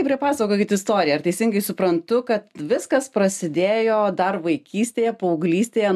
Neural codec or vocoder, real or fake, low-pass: none; real; 14.4 kHz